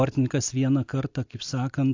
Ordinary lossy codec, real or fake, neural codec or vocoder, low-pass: AAC, 48 kbps; real; none; 7.2 kHz